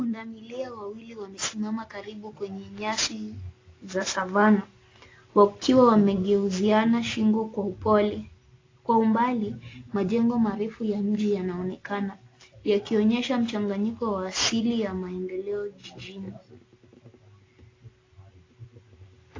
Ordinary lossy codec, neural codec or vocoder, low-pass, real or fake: AAC, 32 kbps; none; 7.2 kHz; real